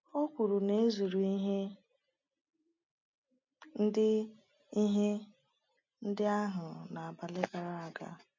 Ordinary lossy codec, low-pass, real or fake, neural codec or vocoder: MP3, 48 kbps; 7.2 kHz; real; none